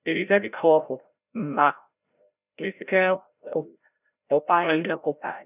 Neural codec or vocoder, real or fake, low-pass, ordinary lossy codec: codec, 16 kHz, 0.5 kbps, FreqCodec, larger model; fake; 3.6 kHz; none